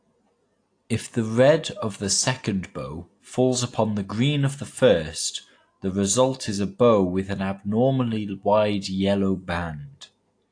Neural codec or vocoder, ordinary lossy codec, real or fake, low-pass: none; AAC, 48 kbps; real; 9.9 kHz